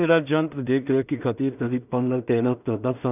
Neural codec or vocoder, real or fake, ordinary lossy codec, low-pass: codec, 16 kHz in and 24 kHz out, 0.4 kbps, LongCat-Audio-Codec, two codebook decoder; fake; none; 3.6 kHz